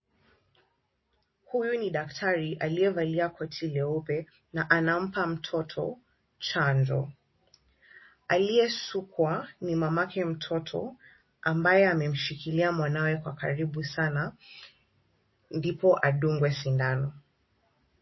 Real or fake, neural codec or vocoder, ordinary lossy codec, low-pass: real; none; MP3, 24 kbps; 7.2 kHz